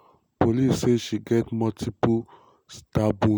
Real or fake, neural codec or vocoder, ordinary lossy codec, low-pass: real; none; none; none